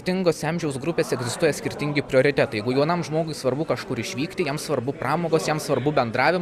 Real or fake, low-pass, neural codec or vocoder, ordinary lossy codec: real; 14.4 kHz; none; Opus, 64 kbps